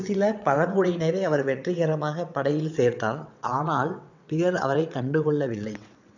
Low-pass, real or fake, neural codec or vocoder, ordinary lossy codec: 7.2 kHz; fake; vocoder, 22.05 kHz, 80 mel bands, WaveNeXt; none